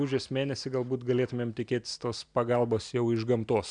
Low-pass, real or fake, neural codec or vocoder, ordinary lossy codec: 9.9 kHz; real; none; MP3, 96 kbps